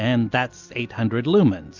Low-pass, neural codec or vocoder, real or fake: 7.2 kHz; none; real